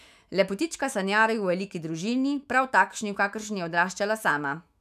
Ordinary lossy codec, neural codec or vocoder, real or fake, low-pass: none; autoencoder, 48 kHz, 128 numbers a frame, DAC-VAE, trained on Japanese speech; fake; 14.4 kHz